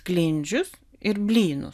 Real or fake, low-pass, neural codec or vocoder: real; 14.4 kHz; none